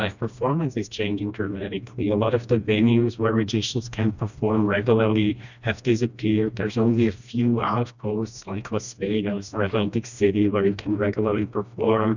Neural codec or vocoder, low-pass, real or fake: codec, 16 kHz, 1 kbps, FreqCodec, smaller model; 7.2 kHz; fake